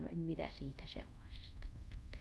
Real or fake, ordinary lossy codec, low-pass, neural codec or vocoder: fake; none; none; codec, 24 kHz, 0.5 kbps, DualCodec